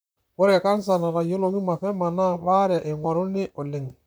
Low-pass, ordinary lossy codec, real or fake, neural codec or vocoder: none; none; fake; vocoder, 44.1 kHz, 128 mel bands, Pupu-Vocoder